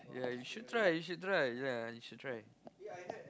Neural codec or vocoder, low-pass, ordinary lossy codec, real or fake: none; none; none; real